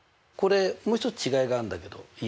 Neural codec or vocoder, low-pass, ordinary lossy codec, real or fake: none; none; none; real